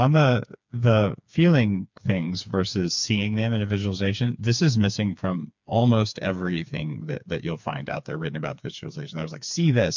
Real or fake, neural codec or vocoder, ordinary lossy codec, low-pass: fake; codec, 16 kHz, 4 kbps, FreqCodec, smaller model; MP3, 64 kbps; 7.2 kHz